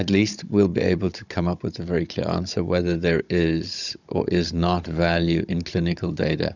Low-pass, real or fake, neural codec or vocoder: 7.2 kHz; fake; codec, 16 kHz, 16 kbps, FunCodec, trained on Chinese and English, 50 frames a second